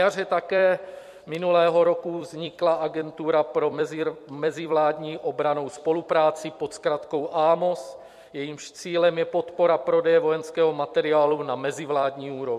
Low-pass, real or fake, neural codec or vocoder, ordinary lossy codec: 14.4 kHz; fake; vocoder, 44.1 kHz, 128 mel bands every 256 samples, BigVGAN v2; MP3, 64 kbps